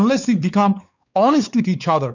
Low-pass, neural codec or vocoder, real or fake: 7.2 kHz; codec, 16 kHz, 4 kbps, X-Codec, WavLM features, trained on Multilingual LibriSpeech; fake